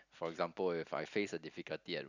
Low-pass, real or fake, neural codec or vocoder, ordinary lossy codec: 7.2 kHz; real; none; none